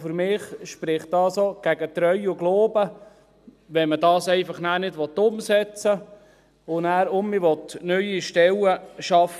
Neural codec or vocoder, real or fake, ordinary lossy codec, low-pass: none; real; none; 14.4 kHz